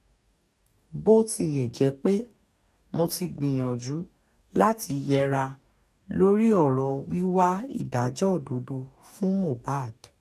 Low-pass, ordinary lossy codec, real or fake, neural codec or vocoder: 14.4 kHz; none; fake; codec, 44.1 kHz, 2.6 kbps, DAC